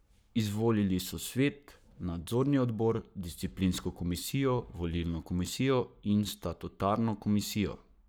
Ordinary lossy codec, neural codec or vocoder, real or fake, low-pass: none; codec, 44.1 kHz, 7.8 kbps, Pupu-Codec; fake; none